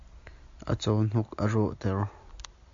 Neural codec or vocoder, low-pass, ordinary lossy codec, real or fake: none; 7.2 kHz; MP3, 48 kbps; real